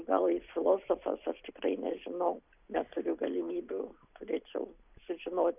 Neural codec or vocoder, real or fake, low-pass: none; real; 3.6 kHz